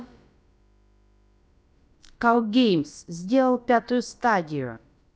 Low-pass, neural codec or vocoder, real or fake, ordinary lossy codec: none; codec, 16 kHz, about 1 kbps, DyCAST, with the encoder's durations; fake; none